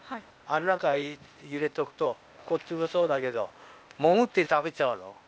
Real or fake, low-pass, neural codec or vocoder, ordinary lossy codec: fake; none; codec, 16 kHz, 0.8 kbps, ZipCodec; none